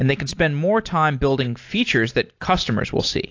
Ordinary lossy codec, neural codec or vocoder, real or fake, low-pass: AAC, 48 kbps; none; real; 7.2 kHz